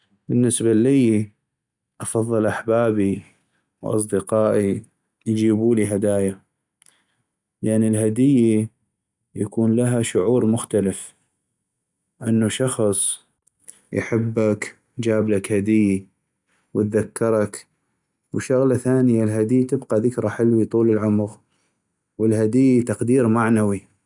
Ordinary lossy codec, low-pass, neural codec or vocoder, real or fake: none; 10.8 kHz; none; real